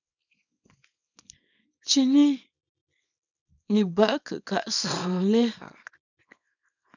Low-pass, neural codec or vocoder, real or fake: 7.2 kHz; codec, 24 kHz, 0.9 kbps, WavTokenizer, small release; fake